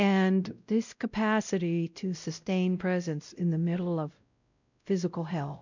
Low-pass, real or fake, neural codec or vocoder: 7.2 kHz; fake; codec, 16 kHz, 0.5 kbps, X-Codec, WavLM features, trained on Multilingual LibriSpeech